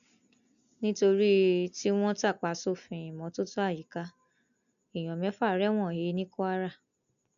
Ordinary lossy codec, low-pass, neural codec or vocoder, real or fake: none; 7.2 kHz; none; real